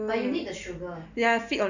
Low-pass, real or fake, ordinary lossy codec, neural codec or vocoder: 7.2 kHz; real; none; none